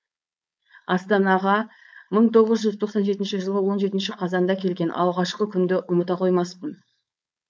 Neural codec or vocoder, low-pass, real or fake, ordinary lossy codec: codec, 16 kHz, 4.8 kbps, FACodec; none; fake; none